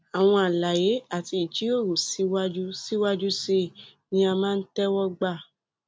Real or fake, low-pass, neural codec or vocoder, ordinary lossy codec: real; none; none; none